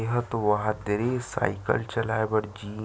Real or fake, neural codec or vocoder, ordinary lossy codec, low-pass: real; none; none; none